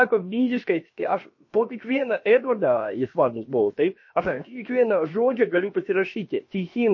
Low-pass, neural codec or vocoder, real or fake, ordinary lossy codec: 7.2 kHz; codec, 16 kHz, about 1 kbps, DyCAST, with the encoder's durations; fake; MP3, 32 kbps